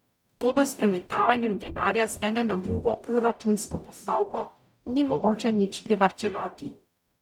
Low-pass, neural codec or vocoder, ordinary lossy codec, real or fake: 19.8 kHz; codec, 44.1 kHz, 0.9 kbps, DAC; none; fake